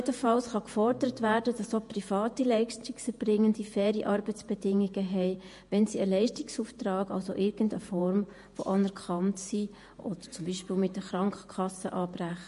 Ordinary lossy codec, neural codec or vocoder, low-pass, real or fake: MP3, 48 kbps; vocoder, 48 kHz, 128 mel bands, Vocos; 14.4 kHz; fake